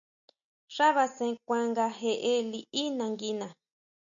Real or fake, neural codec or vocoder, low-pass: real; none; 7.2 kHz